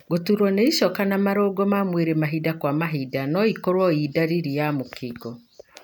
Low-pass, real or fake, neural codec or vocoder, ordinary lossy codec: none; real; none; none